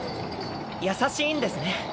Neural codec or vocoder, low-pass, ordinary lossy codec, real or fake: none; none; none; real